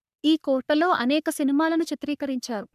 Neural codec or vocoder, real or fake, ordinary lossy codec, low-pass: codec, 44.1 kHz, 3.4 kbps, Pupu-Codec; fake; none; 14.4 kHz